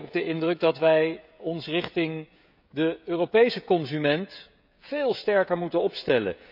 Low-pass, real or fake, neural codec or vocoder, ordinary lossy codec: 5.4 kHz; fake; codec, 16 kHz, 16 kbps, FreqCodec, smaller model; none